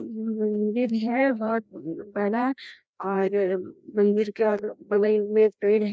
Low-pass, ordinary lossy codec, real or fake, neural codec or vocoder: none; none; fake; codec, 16 kHz, 1 kbps, FreqCodec, larger model